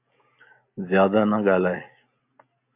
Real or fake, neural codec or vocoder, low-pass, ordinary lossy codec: real; none; 3.6 kHz; MP3, 32 kbps